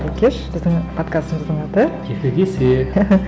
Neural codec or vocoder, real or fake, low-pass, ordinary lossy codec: none; real; none; none